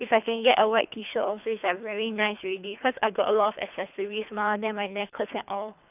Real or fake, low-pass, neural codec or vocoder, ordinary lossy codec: fake; 3.6 kHz; codec, 24 kHz, 3 kbps, HILCodec; none